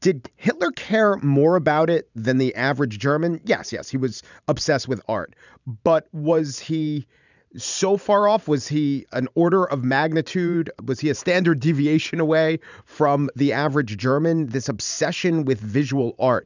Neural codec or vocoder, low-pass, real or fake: vocoder, 44.1 kHz, 128 mel bands every 512 samples, BigVGAN v2; 7.2 kHz; fake